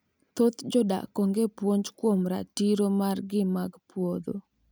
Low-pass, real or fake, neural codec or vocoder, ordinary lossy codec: none; real; none; none